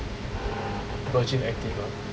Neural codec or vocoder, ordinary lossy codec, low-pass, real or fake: none; none; none; real